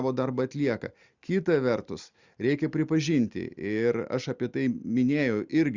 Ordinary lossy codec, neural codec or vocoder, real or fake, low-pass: Opus, 64 kbps; none; real; 7.2 kHz